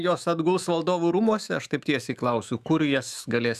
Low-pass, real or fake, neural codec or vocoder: 14.4 kHz; fake; autoencoder, 48 kHz, 128 numbers a frame, DAC-VAE, trained on Japanese speech